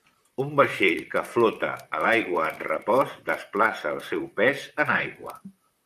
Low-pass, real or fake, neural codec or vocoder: 14.4 kHz; fake; vocoder, 44.1 kHz, 128 mel bands, Pupu-Vocoder